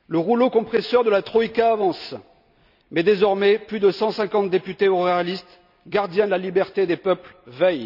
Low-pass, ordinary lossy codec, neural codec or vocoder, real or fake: 5.4 kHz; none; none; real